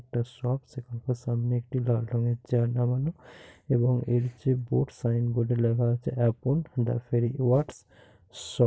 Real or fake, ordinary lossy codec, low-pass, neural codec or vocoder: real; none; none; none